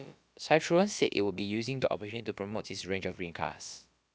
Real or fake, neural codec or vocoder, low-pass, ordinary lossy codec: fake; codec, 16 kHz, about 1 kbps, DyCAST, with the encoder's durations; none; none